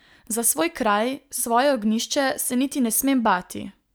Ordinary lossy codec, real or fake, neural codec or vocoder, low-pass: none; real; none; none